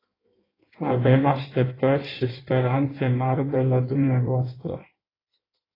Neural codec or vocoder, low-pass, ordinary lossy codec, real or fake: codec, 16 kHz in and 24 kHz out, 1.1 kbps, FireRedTTS-2 codec; 5.4 kHz; AAC, 24 kbps; fake